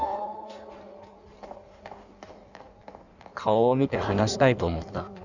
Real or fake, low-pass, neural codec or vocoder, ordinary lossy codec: fake; 7.2 kHz; codec, 16 kHz in and 24 kHz out, 1.1 kbps, FireRedTTS-2 codec; none